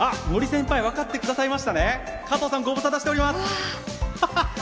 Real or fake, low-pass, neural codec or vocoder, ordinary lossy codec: real; none; none; none